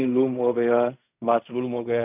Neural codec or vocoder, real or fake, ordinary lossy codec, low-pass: codec, 16 kHz in and 24 kHz out, 0.4 kbps, LongCat-Audio-Codec, fine tuned four codebook decoder; fake; none; 3.6 kHz